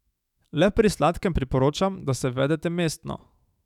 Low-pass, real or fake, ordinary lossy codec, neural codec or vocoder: 19.8 kHz; fake; none; autoencoder, 48 kHz, 128 numbers a frame, DAC-VAE, trained on Japanese speech